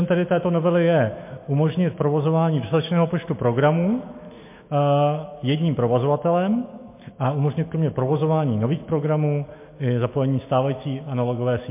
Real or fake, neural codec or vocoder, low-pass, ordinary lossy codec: fake; autoencoder, 48 kHz, 128 numbers a frame, DAC-VAE, trained on Japanese speech; 3.6 kHz; MP3, 24 kbps